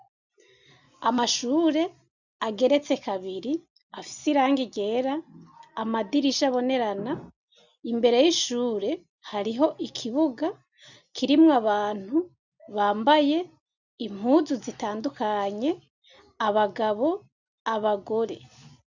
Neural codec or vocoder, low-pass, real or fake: none; 7.2 kHz; real